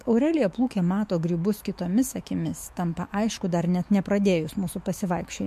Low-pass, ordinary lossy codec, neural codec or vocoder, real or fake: 14.4 kHz; MP3, 64 kbps; autoencoder, 48 kHz, 128 numbers a frame, DAC-VAE, trained on Japanese speech; fake